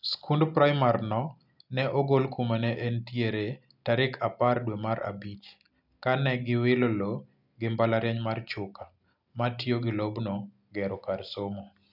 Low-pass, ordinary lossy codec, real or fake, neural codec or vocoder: 5.4 kHz; none; real; none